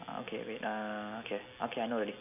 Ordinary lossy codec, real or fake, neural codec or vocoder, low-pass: none; real; none; 3.6 kHz